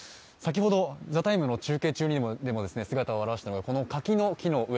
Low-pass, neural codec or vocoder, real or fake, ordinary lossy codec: none; none; real; none